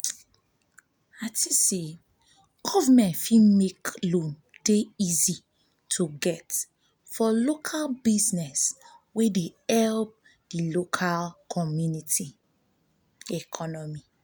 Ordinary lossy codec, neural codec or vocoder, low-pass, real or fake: none; none; none; real